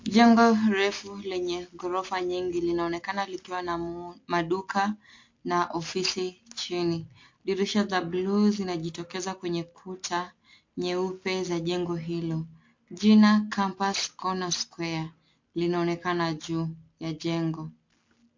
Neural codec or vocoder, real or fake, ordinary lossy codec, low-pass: none; real; MP3, 48 kbps; 7.2 kHz